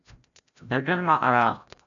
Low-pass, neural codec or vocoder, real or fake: 7.2 kHz; codec, 16 kHz, 0.5 kbps, FreqCodec, larger model; fake